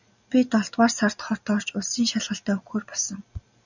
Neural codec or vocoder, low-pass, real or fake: none; 7.2 kHz; real